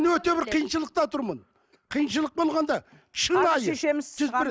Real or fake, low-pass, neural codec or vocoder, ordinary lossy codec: real; none; none; none